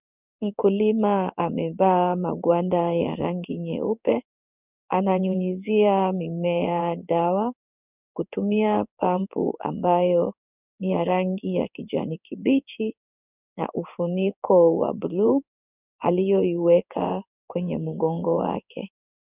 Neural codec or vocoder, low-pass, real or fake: codec, 16 kHz in and 24 kHz out, 1 kbps, XY-Tokenizer; 3.6 kHz; fake